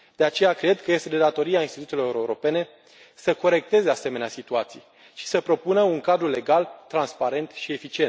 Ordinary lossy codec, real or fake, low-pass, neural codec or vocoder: none; real; none; none